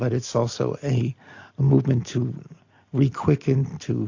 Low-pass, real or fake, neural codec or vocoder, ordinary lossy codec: 7.2 kHz; real; none; AAC, 48 kbps